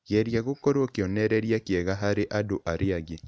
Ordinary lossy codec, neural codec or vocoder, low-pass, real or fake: none; none; none; real